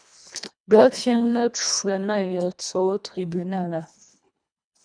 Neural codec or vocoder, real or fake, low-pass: codec, 24 kHz, 1.5 kbps, HILCodec; fake; 9.9 kHz